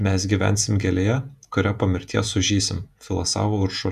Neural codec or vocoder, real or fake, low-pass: vocoder, 48 kHz, 128 mel bands, Vocos; fake; 14.4 kHz